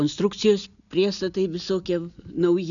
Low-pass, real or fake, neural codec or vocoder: 7.2 kHz; real; none